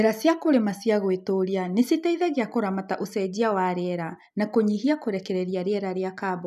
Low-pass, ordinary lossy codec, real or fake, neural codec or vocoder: 14.4 kHz; none; real; none